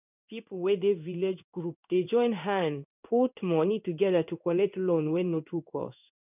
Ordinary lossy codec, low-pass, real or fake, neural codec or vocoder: none; 3.6 kHz; fake; codec, 16 kHz in and 24 kHz out, 1 kbps, XY-Tokenizer